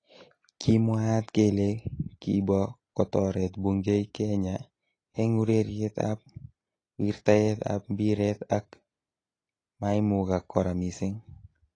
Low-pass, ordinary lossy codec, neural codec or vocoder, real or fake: 9.9 kHz; AAC, 32 kbps; none; real